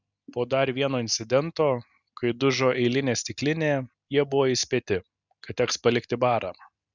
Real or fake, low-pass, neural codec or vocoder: real; 7.2 kHz; none